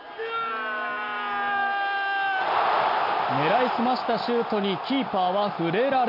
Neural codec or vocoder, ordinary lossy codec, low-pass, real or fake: none; none; 5.4 kHz; real